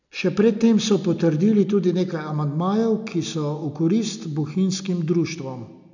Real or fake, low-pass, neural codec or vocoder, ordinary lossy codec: real; 7.2 kHz; none; none